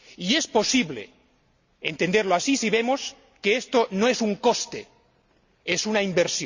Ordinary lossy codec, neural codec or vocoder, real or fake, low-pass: Opus, 64 kbps; none; real; 7.2 kHz